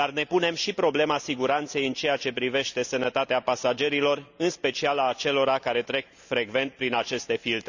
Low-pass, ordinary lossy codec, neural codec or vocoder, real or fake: 7.2 kHz; none; none; real